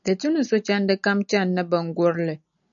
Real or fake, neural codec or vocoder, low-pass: real; none; 7.2 kHz